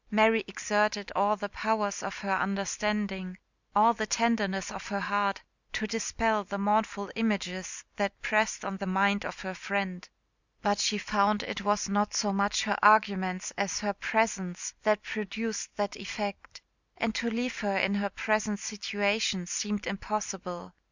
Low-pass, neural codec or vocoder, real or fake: 7.2 kHz; none; real